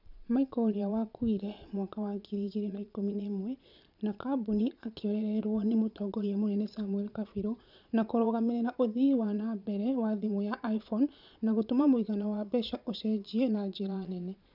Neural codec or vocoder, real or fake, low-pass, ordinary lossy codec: vocoder, 22.05 kHz, 80 mel bands, Vocos; fake; 5.4 kHz; none